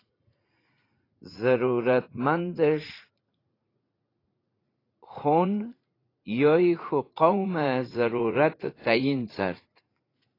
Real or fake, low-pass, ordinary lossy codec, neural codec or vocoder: fake; 5.4 kHz; AAC, 24 kbps; vocoder, 44.1 kHz, 80 mel bands, Vocos